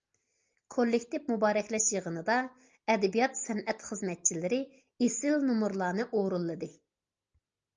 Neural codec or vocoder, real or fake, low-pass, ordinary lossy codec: none; real; 7.2 kHz; Opus, 24 kbps